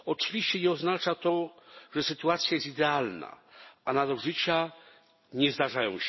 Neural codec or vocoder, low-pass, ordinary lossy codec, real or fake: none; 7.2 kHz; MP3, 24 kbps; real